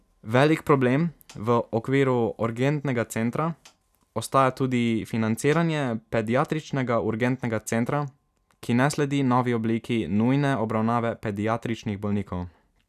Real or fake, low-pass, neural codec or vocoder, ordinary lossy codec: real; 14.4 kHz; none; none